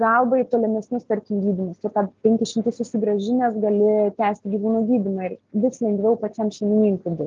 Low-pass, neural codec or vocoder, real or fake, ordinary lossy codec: 7.2 kHz; none; real; Opus, 32 kbps